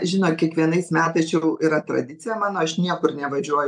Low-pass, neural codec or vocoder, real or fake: 10.8 kHz; none; real